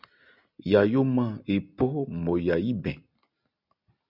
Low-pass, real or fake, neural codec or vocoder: 5.4 kHz; real; none